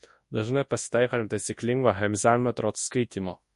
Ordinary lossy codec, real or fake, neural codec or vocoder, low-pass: MP3, 48 kbps; fake; codec, 24 kHz, 0.9 kbps, WavTokenizer, large speech release; 10.8 kHz